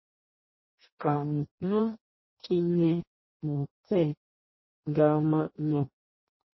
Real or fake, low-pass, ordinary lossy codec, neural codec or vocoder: fake; 7.2 kHz; MP3, 24 kbps; codec, 16 kHz in and 24 kHz out, 0.6 kbps, FireRedTTS-2 codec